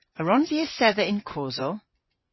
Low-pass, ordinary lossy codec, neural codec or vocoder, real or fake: 7.2 kHz; MP3, 24 kbps; vocoder, 24 kHz, 100 mel bands, Vocos; fake